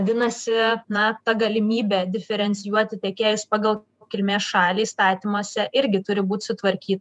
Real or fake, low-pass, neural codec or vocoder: fake; 10.8 kHz; vocoder, 48 kHz, 128 mel bands, Vocos